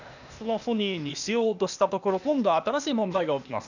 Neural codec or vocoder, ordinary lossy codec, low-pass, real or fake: codec, 16 kHz, 0.8 kbps, ZipCodec; none; 7.2 kHz; fake